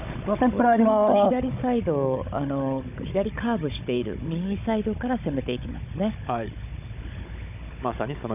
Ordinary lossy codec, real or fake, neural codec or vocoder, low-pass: none; fake; codec, 16 kHz, 16 kbps, FunCodec, trained on Chinese and English, 50 frames a second; 3.6 kHz